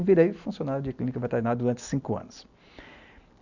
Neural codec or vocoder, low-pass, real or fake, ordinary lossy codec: none; 7.2 kHz; real; none